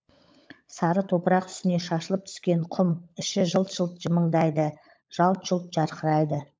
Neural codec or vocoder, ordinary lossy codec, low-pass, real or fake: codec, 16 kHz, 16 kbps, FunCodec, trained on LibriTTS, 50 frames a second; none; none; fake